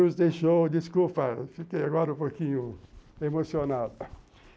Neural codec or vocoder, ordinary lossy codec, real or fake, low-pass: none; none; real; none